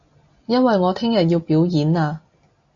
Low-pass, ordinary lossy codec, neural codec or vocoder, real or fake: 7.2 kHz; AAC, 48 kbps; none; real